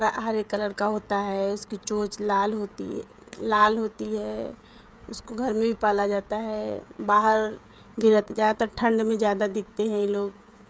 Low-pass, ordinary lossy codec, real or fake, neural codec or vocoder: none; none; fake; codec, 16 kHz, 16 kbps, FreqCodec, smaller model